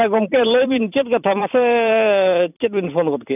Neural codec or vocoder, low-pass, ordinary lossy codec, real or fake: vocoder, 44.1 kHz, 128 mel bands every 256 samples, BigVGAN v2; 3.6 kHz; none; fake